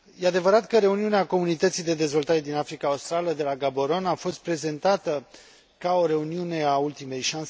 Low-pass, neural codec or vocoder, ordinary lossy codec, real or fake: none; none; none; real